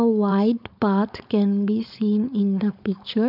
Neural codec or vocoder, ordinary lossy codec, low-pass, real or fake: codec, 16 kHz, 4.8 kbps, FACodec; none; 5.4 kHz; fake